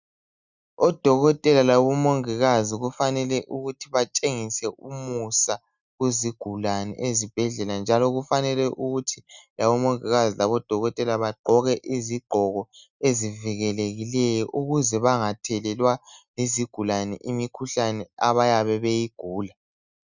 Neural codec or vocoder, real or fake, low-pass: none; real; 7.2 kHz